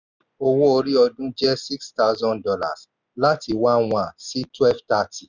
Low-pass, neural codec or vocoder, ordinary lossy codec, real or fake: 7.2 kHz; none; none; real